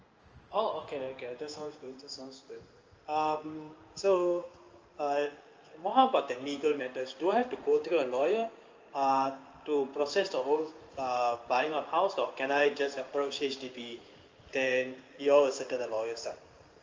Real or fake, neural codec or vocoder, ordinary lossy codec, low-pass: fake; codec, 16 kHz in and 24 kHz out, 1 kbps, XY-Tokenizer; Opus, 24 kbps; 7.2 kHz